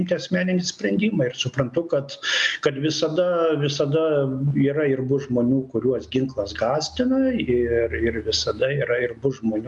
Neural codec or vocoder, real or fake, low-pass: none; real; 10.8 kHz